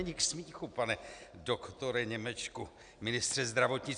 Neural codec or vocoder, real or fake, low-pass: none; real; 9.9 kHz